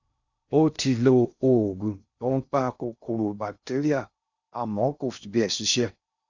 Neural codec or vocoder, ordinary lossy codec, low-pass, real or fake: codec, 16 kHz in and 24 kHz out, 0.6 kbps, FocalCodec, streaming, 2048 codes; Opus, 64 kbps; 7.2 kHz; fake